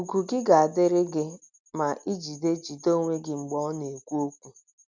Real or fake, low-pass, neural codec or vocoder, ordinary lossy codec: real; 7.2 kHz; none; none